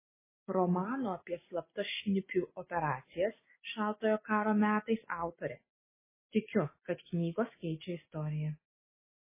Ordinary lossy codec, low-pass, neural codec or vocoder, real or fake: MP3, 16 kbps; 3.6 kHz; none; real